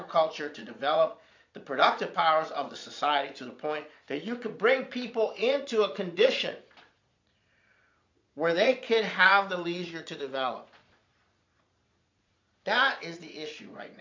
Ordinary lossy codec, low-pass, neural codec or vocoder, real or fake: MP3, 48 kbps; 7.2 kHz; vocoder, 22.05 kHz, 80 mel bands, Vocos; fake